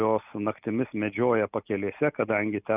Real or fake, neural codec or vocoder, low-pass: real; none; 3.6 kHz